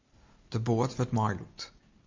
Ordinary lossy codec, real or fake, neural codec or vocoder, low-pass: MP3, 64 kbps; real; none; 7.2 kHz